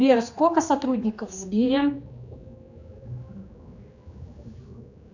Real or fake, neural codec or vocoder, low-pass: fake; codec, 16 kHz, 2 kbps, X-Codec, HuBERT features, trained on general audio; 7.2 kHz